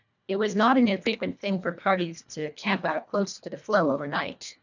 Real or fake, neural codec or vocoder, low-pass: fake; codec, 24 kHz, 1.5 kbps, HILCodec; 7.2 kHz